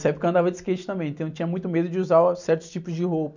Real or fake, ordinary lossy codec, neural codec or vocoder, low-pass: real; none; none; 7.2 kHz